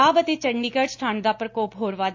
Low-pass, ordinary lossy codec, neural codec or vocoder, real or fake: 7.2 kHz; AAC, 48 kbps; none; real